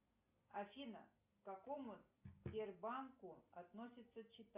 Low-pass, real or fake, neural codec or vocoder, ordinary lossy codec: 3.6 kHz; real; none; Opus, 64 kbps